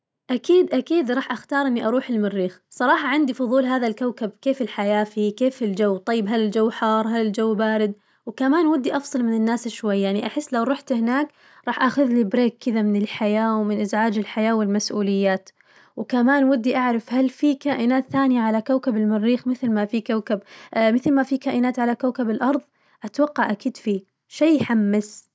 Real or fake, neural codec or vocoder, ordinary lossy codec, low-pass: real; none; none; none